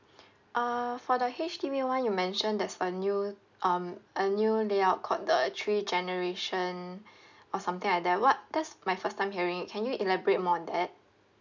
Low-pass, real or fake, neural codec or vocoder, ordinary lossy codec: 7.2 kHz; real; none; none